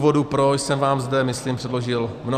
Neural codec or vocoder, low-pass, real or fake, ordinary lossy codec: none; 14.4 kHz; real; Opus, 64 kbps